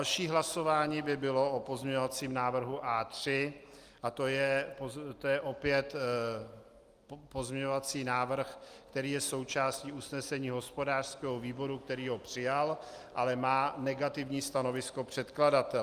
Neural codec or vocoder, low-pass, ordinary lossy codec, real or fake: none; 14.4 kHz; Opus, 32 kbps; real